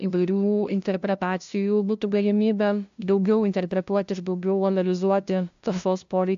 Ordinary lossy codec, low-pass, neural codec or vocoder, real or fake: AAC, 64 kbps; 7.2 kHz; codec, 16 kHz, 0.5 kbps, FunCodec, trained on LibriTTS, 25 frames a second; fake